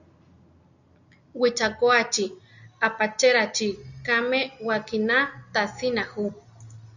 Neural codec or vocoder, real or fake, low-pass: none; real; 7.2 kHz